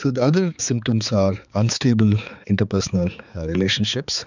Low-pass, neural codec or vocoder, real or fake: 7.2 kHz; codec, 16 kHz, 4 kbps, X-Codec, HuBERT features, trained on balanced general audio; fake